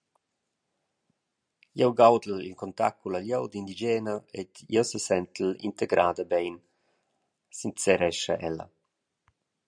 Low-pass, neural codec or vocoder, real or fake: 9.9 kHz; none; real